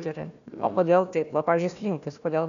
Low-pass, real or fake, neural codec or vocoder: 7.2 kHz; fake; codec, 16 kHz, 1 kbps, FunCodec, trained on Chinese and English, 50 frames a second